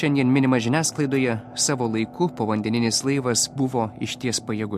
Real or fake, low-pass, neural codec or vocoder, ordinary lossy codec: real; 14.4 kHz; none; MP3, 64 kbps